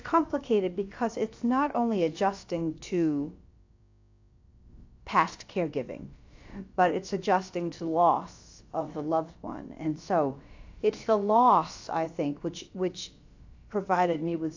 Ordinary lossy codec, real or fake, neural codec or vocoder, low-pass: AAC, 48 kbps; fake; codec, 16 kHz, about 1 kbps, DyCAST, with the encoder's durations; 7.2 kHz